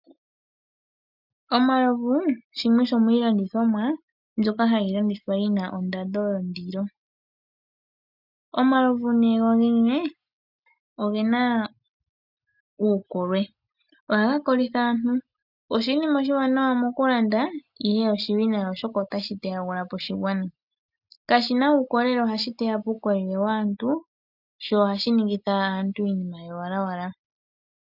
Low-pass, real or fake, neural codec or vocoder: 5.4 kHz; real; none